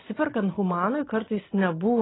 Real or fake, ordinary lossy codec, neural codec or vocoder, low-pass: real; AAC, 16 kbps; none; 7.2 kHz